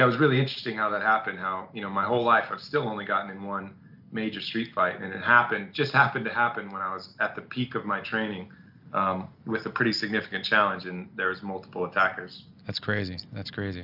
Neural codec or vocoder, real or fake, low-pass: none; real; 5.4 kHz